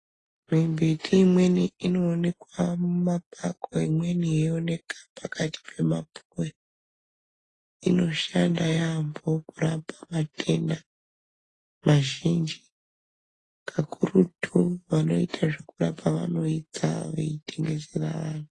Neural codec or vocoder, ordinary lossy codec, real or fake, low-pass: none; AAC, 32 kbps; real; 10.8 kHz